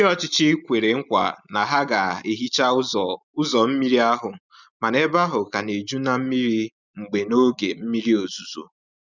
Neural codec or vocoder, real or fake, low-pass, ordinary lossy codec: none; real; 7.2 kHz; none